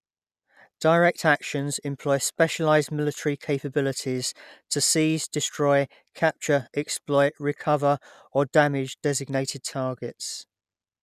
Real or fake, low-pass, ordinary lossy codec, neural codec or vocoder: real; 14.4 kHz; none; none